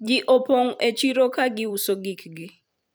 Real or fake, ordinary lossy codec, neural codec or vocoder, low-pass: fake; none; vocoder, 44.1 kHz, 128 mel bands every 256 samples, BigVGAN v2; none